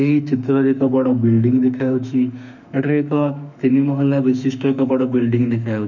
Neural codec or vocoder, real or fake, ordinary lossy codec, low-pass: autoencoder, 48 kHz, 32 numbers a frame, DAC-VAE, trained on Japanese speech; fake; none; 7.2 kHz